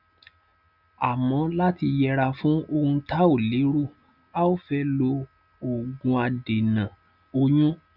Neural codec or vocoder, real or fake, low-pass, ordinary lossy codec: none; real; 5.4 kHz; none